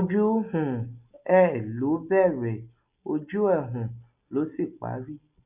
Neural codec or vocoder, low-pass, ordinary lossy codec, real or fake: none; 3.6 kHz; none; real